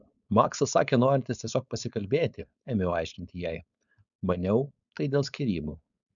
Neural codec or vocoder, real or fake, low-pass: codec, 16 kHz, 4.8 kbps, FACodec; fake; 7.2 kHz